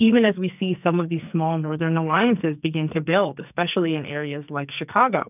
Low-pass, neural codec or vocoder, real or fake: 3.6 kHz; codec, 44.1 kHz, 2.6 kbps, SNAC; fake